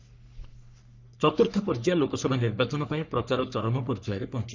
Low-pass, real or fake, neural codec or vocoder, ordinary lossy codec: 7.2 kHz; fake; codec, 44.1 kHz, 3.4 kbps, Pupu-Codec; none